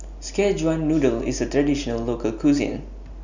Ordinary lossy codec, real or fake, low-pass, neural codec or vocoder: none; real; 7.2 kHz; none